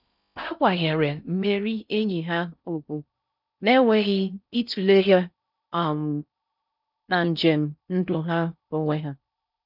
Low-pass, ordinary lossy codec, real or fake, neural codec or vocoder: 5.4 kHz; none; fake; codec, 16 kHz in and 24 kHz out, 0.6 kbps, FocalCodec, streaming, 4096 codes